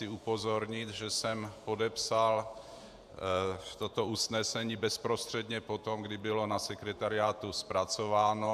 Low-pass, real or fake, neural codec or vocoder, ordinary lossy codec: 14.4 kHz; fake; vocoder, 48 kHz, 128 mel bands, Vocos; AAC, 96 kbps